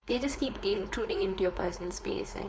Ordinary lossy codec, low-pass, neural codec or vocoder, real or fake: none; none; codec, 16 kHz, 4.8 kbps, FACodec; fake